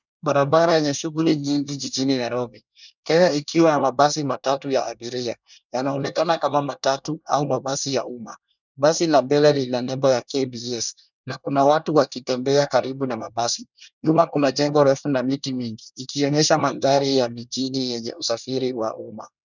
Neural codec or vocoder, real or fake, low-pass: codec, 24 kHz, 1 kbps, SNAC; fake; 7.2 kHz